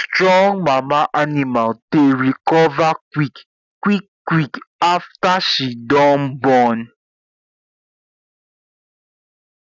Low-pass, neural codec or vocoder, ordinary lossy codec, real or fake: 7.2 kHz; none; none; real